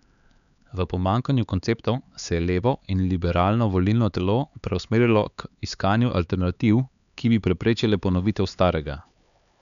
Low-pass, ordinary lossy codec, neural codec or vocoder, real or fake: 7.2 kHz; none; codec, 16 kHz, 4 kbps, X-Codec, HuBERT features, trained on LibriSpeech; fake